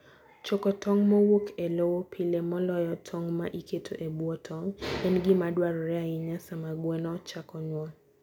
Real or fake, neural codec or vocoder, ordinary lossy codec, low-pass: real; none; none; 19.8 kHz